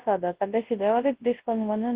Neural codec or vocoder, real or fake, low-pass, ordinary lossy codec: codec, 24 kHz, 0.9 kbps, WavTokenizer, large speech release; fake; 3.6 kHz; Opus, 16 kbps